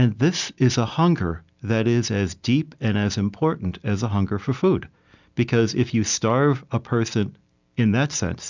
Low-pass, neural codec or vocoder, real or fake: 7.2 kHz; none; real